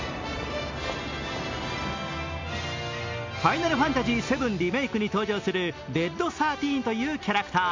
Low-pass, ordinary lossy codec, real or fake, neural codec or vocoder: 7.2 kHz; MP3, 64 kbps; real; none